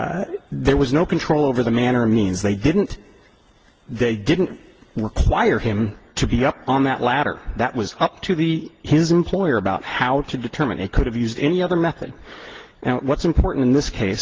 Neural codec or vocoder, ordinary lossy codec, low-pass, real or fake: none; Opus, 16 kbps; 7.2 kHz; real